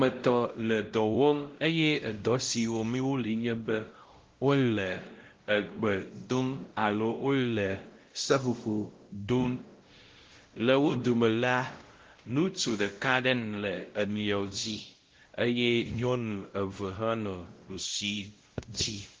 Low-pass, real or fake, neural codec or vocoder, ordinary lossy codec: 7.2 kHz; fake; codec, 16 kHz, 0.5 kbps, X-Codec, WavLM features, trained on Multilingual LibriSpeech; Opus, 16 kbps